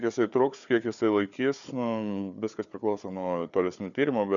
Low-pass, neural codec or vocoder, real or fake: 7.2 kHz; codec, 16 kHz, 4 kbps, FunCodec, trained on Chinese and English, 50 frames a second; fake